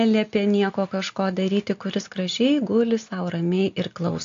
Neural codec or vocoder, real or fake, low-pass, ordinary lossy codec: none; real; 7.2 kHz; AAC, 48 kbps